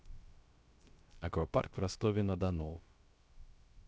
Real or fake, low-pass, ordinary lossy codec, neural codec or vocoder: fake; none; none; codec, 16 kHz, 0.3 kbps, FocalCodec